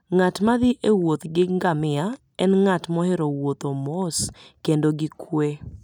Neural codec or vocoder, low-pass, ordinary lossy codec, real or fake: none; 19.8 kHz; none; real